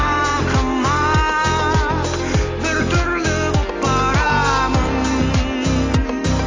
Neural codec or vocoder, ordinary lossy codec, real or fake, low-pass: none; AAC, 32 kbps; real; 7.2 kHz